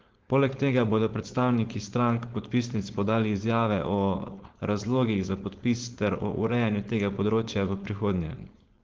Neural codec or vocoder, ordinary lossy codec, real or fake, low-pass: codec, 16 kHz, 4.8 kbps, FACodec; Opus, 16 kbps; fake; 7.2 kHz